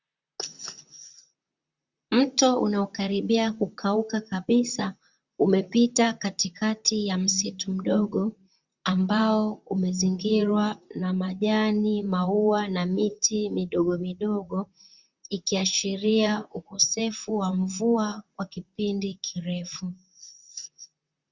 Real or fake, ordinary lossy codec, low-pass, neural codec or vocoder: fake; Opus, 64 kbps; 7.2 kHz; vocoder, 44.1 kHz, 128 mel bands, Pupu-Vocoder